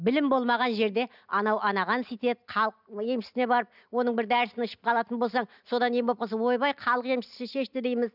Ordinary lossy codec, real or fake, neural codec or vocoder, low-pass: none; real; none; 5.4 kHz